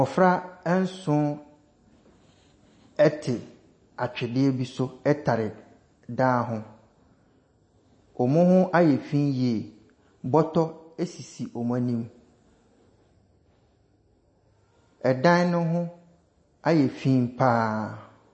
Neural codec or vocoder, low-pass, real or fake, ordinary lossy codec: none; 9.9 kHz; real; MP3, 32 kbps